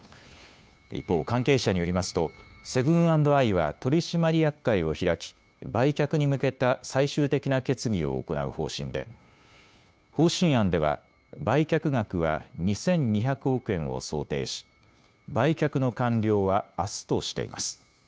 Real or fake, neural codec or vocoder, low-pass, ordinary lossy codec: fake; codec, 16 kHz, 2 kbps, FunCodec, trained on Chinese and English, 25 frames a second; none; none